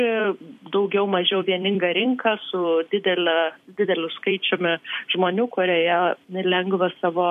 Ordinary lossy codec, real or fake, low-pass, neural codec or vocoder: MP3, 64 kbps; fake; 14.4 kHz; vocoder, 44.1 kHz, 128 mel bands every 256 samples, BigVGAN v2